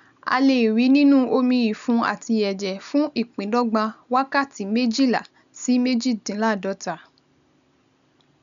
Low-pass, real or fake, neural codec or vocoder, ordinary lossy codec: 7.2 kHz; real; none; none